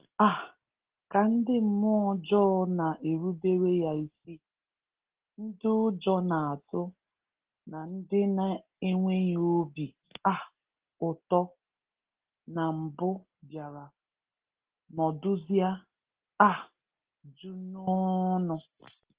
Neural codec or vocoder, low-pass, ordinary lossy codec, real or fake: none; 3.6 kHz; Opus, 16 kbps; real